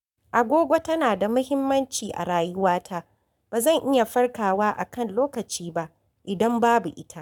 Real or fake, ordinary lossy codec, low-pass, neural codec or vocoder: fake; none; 19.8 kHz; codec, 44.1 kHz, 7.8 kbps, Pupu-Codec